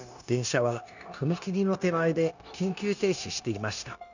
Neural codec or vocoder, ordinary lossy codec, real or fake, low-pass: codec, 16 kHz, 0.9 kbps, LongCat-Audio-Codec; none; fake; 7.2 kHz